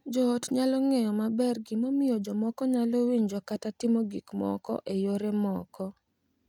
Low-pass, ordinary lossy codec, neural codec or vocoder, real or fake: 19.8 kHz; none; none; real